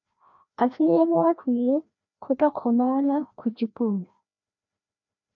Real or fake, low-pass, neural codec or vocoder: fake; 7.2 kHz; codec, 16 kHz, 1 kbps, FreqCodec, larger model